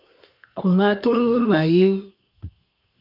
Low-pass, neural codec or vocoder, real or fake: 5.4 kHz; codec, 24 kHz, 1 kbps, SNAC; fake